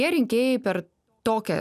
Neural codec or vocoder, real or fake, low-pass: none; real; 14.4 kHz